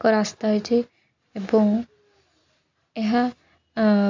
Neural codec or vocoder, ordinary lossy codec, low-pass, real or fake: none; AAC, 48 kbps; 7.2 kHz; real